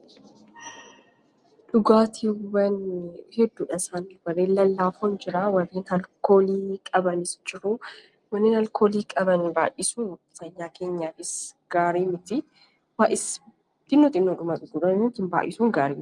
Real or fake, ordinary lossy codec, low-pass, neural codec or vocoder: real; Opus, 32 kbps; 10.8 kHz; none